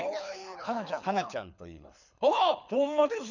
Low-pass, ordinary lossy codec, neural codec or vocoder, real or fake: 7.2 kHz; none; codec, 24 kHz, 6 kbps, HILCodec; fake